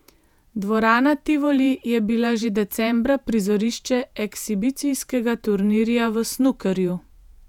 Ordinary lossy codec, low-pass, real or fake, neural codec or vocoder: none; 19.8 kHz; fake; vocoder, 48 kHz, 128 mel bands, Vocos